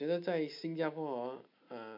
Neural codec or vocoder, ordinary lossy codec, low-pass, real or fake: none; none; 5.4 kHz; real